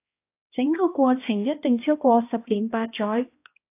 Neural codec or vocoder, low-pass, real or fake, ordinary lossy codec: codec, 16 kHz, 1 kbps, X-Codec, HuBERT features, trained on balanced general audio; 3.6 kHz; fake; AAC, 24 kbps